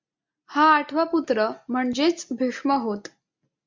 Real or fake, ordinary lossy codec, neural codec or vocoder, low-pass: real; MP3, 64 kbps; none; 7.2 kHz